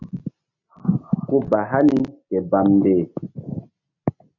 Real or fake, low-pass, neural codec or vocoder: real; 7.2 kHz; none